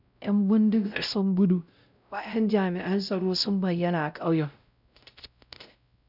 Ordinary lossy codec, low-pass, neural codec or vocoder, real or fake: none; 5.4 kHz; codec, 16 kHz, 0.5 kbps, X-Codec, WavLM features, trained on Multilingual LibriSpeech; fake